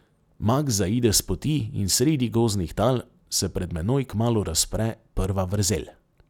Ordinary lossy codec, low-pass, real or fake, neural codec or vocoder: none; 19.8 kHz; real; none